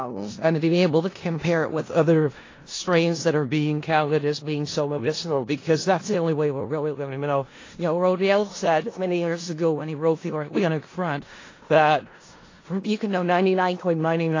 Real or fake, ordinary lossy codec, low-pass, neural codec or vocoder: fake; AAC, 32 kbps; 7.2 kHz; codec, 16 kHz in and 24 kHz out, 0.4 kbps, LongCat-Audio-Codec, four codebook decoder